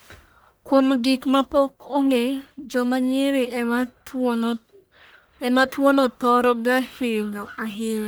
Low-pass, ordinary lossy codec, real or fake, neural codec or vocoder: none; none; fake; codec, 44.1 kHz, 1.7 kbps, Pupu-Codec